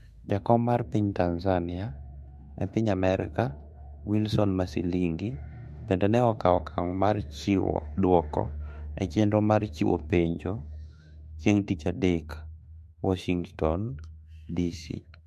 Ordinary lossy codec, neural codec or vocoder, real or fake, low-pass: MP3, 64 kbps; autoencoder, 48 kHz, 32 numbers a frame, DAC-VAE, trained on Japanese speech; fake; 14.4 kHz